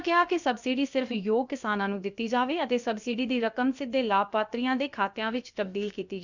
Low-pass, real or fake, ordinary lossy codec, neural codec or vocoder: 7.2 kHz; fake; none; codec, 16 kHz, about 1 kbps, DyCAST, with the encoder's durations